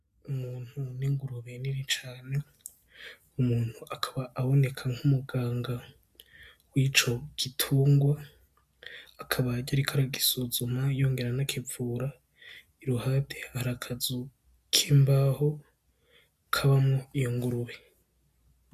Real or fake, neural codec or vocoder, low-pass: real; none; 14.4 kHz